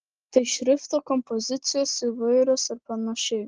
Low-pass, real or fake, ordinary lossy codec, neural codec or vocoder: 7.2 kHz; real; Opus, 16 kbps; none